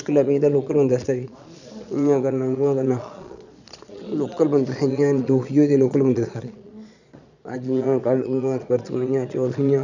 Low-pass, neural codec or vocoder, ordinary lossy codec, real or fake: 7.2 kHz; vocoder, 22.05 kHz, 80 mel bands, Vocos; none; fake